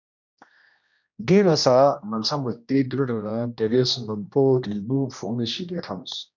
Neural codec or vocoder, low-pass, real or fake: codec, 16 kHz, 1 kbps, X-Codec, HuBERT features, trained on general audio; 7.2 kHz; fake